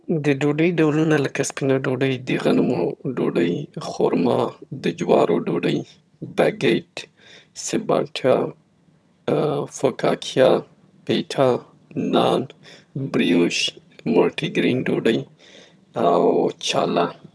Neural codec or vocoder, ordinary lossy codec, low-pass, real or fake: vocoder, 22.05 kHz, 80 mel bands, HiFi-GAN; none; none; fake